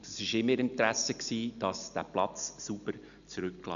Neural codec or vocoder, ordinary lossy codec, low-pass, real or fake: none; none; 7.2 kHz; real